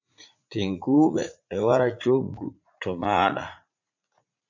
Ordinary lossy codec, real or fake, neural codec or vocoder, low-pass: MP3, 64 kbps; fake; vocoder, 44.1 kHz, 80 mel bands, Vocos; 7.2 kHz